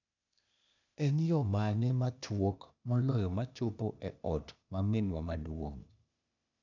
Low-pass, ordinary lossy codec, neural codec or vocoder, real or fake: 7.2 kHz; none; codec, 16 kHz, 0.8 kbps, ZipCodec; fake